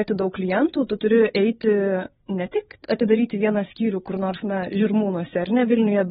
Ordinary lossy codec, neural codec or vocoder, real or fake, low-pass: AAC, 16 kbps; codec, 16 kHz, 8 kbps, FreqCodec, larger model; fake; 7.2 kHz